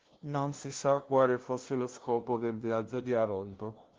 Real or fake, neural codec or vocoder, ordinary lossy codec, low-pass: fake; codec, 16 kHz, 0.5 kbps, FunCodec, trained on LibriTTS, 25 frames a second; Opus, 16 kbps; 7.2 kHz